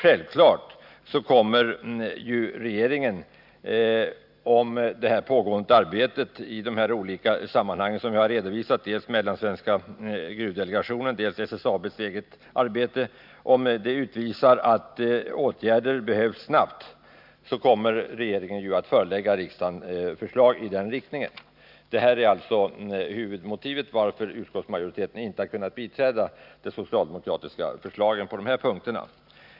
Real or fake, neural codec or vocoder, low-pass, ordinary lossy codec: real; none; 5.4 kHz; none